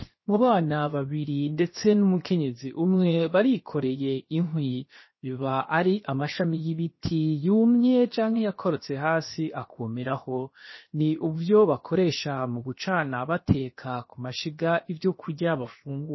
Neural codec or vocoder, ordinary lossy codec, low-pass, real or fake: codec, 16 kHz, 0.7 kbps, FocalCodec; MP3, 24 kbps; 7.2 kHz; fake